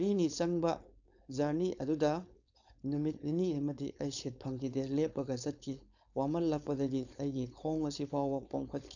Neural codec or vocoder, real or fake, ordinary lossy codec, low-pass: codec, 16 kHz, 4.8 kbps, FACodec; fake; none; 7.2 kHz